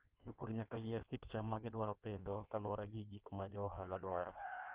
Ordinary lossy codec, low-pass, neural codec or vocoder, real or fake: Opus, 32 kbps; 3.6 kHz; codec, 16 kHz in and 24 kHz out, 1.1 kbps, FireRedTTS-2 codec; fake